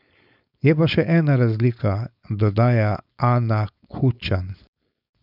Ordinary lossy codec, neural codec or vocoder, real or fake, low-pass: none; codec, 16 kHz, 4.8 kbps, FACodec; fake; 5.4 kHz